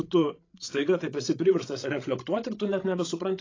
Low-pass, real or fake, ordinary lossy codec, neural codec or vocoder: 7.2 kHz; fake; AAC, 32 kbps; codec, 16 kHz, 16 kbps, FreqCodec, larger model